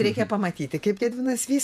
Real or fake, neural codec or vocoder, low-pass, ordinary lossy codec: fake; vocoder, 48 kHz, 128 mel bands, Vocos; 14.4 kHz; MP3, 96 kbps